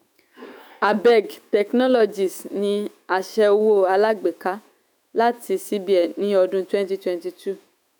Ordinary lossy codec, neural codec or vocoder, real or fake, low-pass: none; autoencoder, 48 kHz, 128 numbers a frame, DAC-VAE, trained on Japanese speech; fake; none